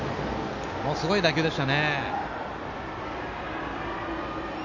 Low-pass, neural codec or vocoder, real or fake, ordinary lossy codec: 7.2 kHz; none; real; none